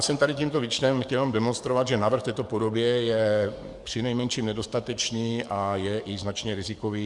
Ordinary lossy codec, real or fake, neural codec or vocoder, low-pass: Opus, 64 kbps; fake; codec, 44.1 kHz, 7.8 kbps, DAC; 10.8 kHz